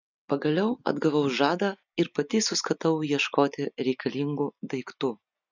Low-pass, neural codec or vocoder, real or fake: 7.2 kHz; none; real